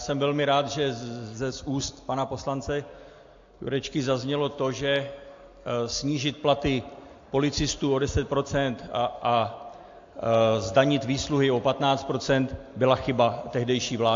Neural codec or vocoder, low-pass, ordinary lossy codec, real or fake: none; 7.2 kHz; AAC, 48 kbps; real